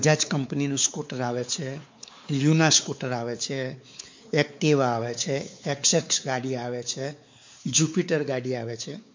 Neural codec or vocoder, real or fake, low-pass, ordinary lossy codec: codec, 16 kHz, 4 kbps, X-Codec, WavLM features, trained on Multilingual LibriSpeech; fake; 7.2 kHz; MP3, 48 kbps